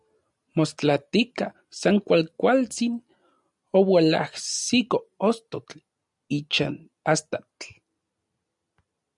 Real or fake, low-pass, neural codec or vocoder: real; 10.8 kHz; none